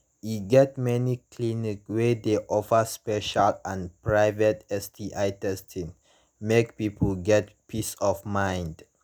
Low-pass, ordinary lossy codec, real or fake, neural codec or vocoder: none; none; real; none